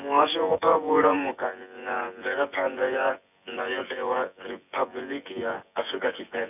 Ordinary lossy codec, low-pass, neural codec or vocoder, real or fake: none; 3.6 kHz; vocoder, 24 kHz, 100 mel bands, Vocos; fake